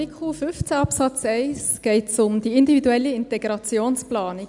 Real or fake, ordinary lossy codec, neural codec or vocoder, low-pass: real; none; none; 10.8 kHz